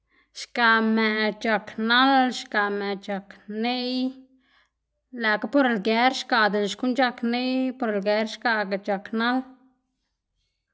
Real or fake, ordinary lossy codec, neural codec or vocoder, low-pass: real; none; none; none